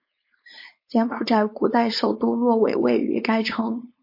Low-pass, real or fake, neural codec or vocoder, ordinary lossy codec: 5.4 kHz; fake; codec, 16 kHz, 4.8 kbps, FACodec; MP3, 32 kbps